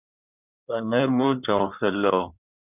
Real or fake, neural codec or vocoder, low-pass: fake; codec, 16 kHz in and 24 kHz out, 2.2 kbps, FireRedTTS-2 codec; 3.6 kHz